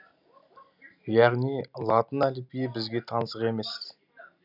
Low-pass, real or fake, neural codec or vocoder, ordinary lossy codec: 5.4 kHz; real; none; none